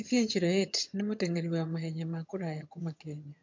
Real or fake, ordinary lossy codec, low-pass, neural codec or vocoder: fake; MP3, 48 kbps; 7.2 kHz; vocoder, 22.05 kHz, 80 mel bands, HiFi-GAN